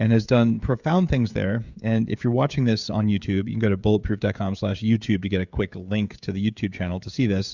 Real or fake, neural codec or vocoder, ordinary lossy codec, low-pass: fake; codec, 16 kHz, 16 kbps, FreqCodec, smaller model; Opus, 64 kbps; 7.2 kHz